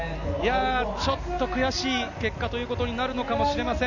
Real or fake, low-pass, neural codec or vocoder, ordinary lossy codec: real; 7.2 kHz; none; none